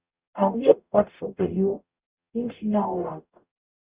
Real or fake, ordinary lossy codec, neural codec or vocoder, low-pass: fake; Opus, 64 kbps; codec, 44.1 kHz, 0.9 kbps, DAC; 3.6 kHz